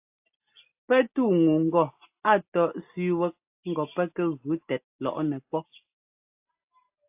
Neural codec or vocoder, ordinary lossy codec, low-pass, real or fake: none; AAC, 32 kbps; 3.6 kHz; real